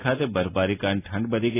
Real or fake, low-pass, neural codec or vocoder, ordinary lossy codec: real; 3.6 kHz; none; AAC, 16 kbps